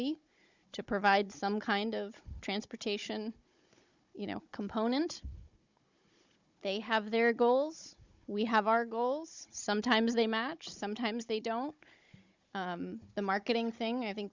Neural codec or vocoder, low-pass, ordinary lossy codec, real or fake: codec, 16 kHz, 16 kbps, FunCodec, trained on Chinese and English, 50 frames a second; 7.2 kHz; Opus, 64 kbps; fake